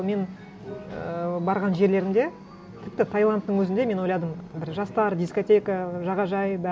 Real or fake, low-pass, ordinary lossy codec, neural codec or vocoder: real; none; none; none